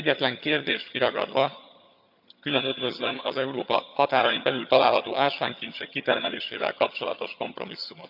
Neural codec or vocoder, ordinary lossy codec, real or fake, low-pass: vocoder, 22.05 kHz, 80 mel bands, HiFi-GAN; none; fake; 5.4 kHz